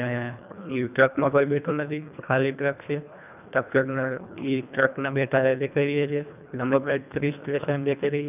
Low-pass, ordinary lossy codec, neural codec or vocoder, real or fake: 3.6 kHz; none; codec, 24 kHz, 1.5 kbps, HILCodec; fake